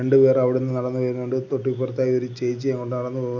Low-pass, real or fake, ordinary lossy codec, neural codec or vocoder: 7.2 kHz; real; none; none